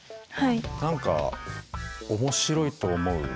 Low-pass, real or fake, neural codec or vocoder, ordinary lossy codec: none; real; none; none